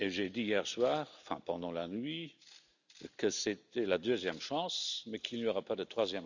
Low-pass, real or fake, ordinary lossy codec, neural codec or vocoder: 7.2 kHz; real; none; none